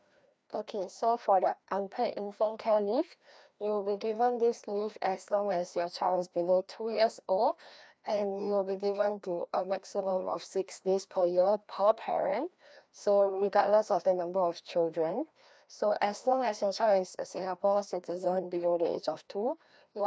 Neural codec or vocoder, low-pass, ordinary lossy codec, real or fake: codec, 16 kHz, 1 kbps, FreqCodec, larger model; none; none; fake